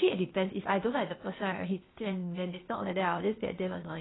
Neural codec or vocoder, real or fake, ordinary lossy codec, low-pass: codec, 16 kHz, 0.8 kbps, ZipCodec; fake; AAC, 16 kbps; 7.2 kHz